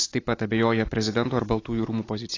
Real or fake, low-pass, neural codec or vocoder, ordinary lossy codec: real; 7.2 kHz; none; AAC, 32 kbps